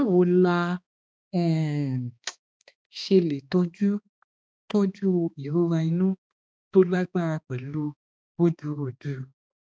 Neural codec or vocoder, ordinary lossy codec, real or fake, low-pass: codec, 16 kHz, 2 kbps, X-Codec, HuBERT features, trained on balanced general audio; none; fake; none